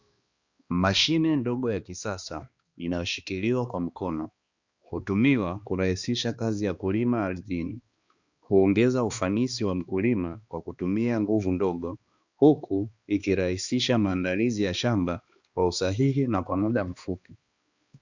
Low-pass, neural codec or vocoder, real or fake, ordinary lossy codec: 7.2 kHz; codec, 16 kHz, 2 kbps, X-Codec, HuBERT features, trained on balanced general audio; fake; Opus, 64 kbps